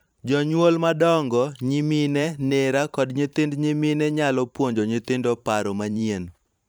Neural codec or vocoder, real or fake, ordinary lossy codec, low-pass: vocoder, 44.1 kHz, 128 mel bands every 512 samples, BigVGAN v2; fake; none; none